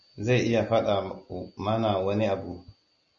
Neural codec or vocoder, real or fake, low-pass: none; real; 7.2 kHz